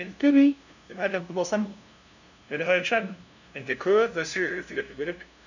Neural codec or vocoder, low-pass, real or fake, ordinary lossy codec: codec, 16 kHz, 0.5 kbps, FunCodec, trained on LibriTTS, 25 frames a second; 7.2 kHz; fake; none